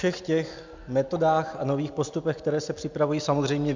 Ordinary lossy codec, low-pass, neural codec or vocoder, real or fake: MP3, 64 kbps; 7.2 kHz; none; real